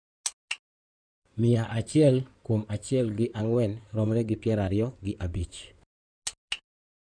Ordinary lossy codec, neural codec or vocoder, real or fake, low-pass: none; codec, 16 kHz in and 24 kHz out, 2.2 kbps, FireRedTTS-2 codec; fake; 9.9 kHz